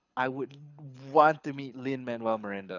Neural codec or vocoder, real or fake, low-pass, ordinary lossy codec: codec, 24 kHz, 6 kbps, HILCodec; fake; 7.2 kHz; none